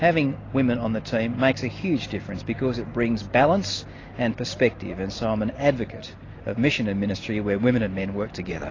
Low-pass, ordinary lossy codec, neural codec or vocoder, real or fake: 7.2 kHz; AAC, 32 kbps; none; real